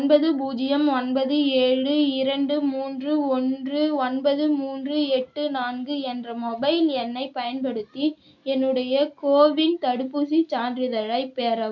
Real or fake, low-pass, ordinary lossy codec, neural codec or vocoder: real; 7.2 kHz; none; none